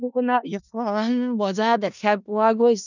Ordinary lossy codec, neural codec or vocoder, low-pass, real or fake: none; codec, 16 kHz in and 24 kHz out, 0.4 kbps, LongCat-Audio-Codec, four codebook decoder; 7.2 kHz; fake